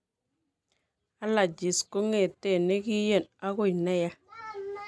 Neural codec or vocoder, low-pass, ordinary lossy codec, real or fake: none; none; none; real